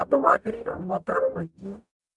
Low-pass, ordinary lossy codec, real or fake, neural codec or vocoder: 10.8 kHz; MP3, 96 kbps; fake; codec, 44.1 kHz, 0.9 kbps, DAC